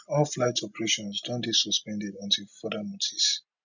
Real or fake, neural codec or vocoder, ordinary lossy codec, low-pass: real; none; none; 7.2 kHz